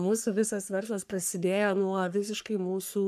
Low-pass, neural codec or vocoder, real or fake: 14.4 kHz; codec, 44.1 kHz, 3.4 kbps, Pupu-Codec; fake